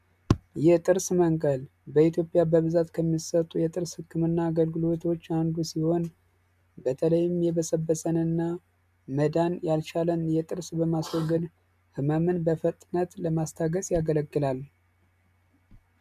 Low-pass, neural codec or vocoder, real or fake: 14.4 kHz; none; real